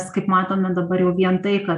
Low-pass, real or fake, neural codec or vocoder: 10.8 kHz; real; none